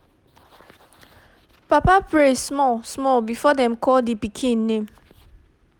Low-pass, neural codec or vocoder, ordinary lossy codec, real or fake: 19.8 kHz; none; none; real